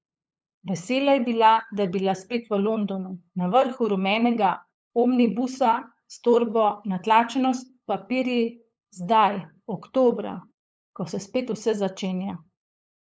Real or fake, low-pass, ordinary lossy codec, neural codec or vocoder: fake; none; none; codec, 16 kHz, 8 kbps, FunCodec, trained on LibriTTS, 25 frames a second